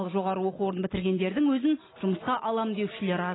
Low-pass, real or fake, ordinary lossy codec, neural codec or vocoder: 7.2 kHz; real; AAC, 16 kbps; none